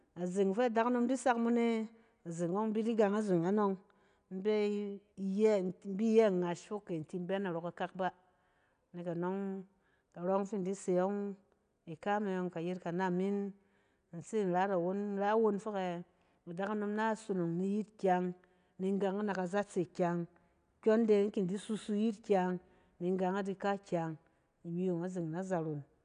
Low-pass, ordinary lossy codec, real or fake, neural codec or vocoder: 9.9 kHz; none; real; none